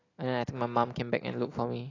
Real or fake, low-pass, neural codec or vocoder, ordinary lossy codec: real; 7.2 kHz; none; AAC, 32 kbps